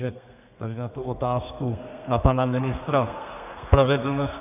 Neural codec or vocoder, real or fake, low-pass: codec, 32 kHz, 1.9 kbps, SNAC; fake; 3.6 kHz